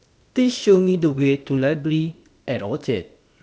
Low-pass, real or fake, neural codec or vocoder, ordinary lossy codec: none; fake; codec, 16 kHz, 0.8 kbps, ZipCodec; none